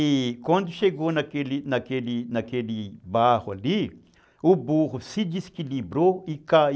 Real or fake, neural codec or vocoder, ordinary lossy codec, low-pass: real; none; none; none